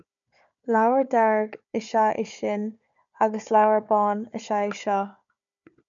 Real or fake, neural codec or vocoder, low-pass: fake; codec, 16 kHz, 4 kbps, FunCodec, trained on Chinese and English, 50 frames a second; 7.2 kHz